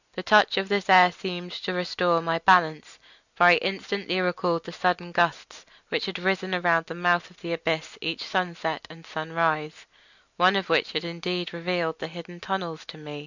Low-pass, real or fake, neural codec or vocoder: 7.2 kHz; real; none